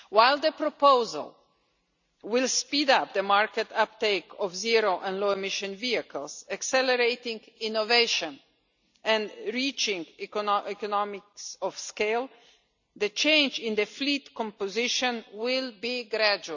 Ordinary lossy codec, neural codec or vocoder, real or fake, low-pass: none; none; real; 7.2 kHz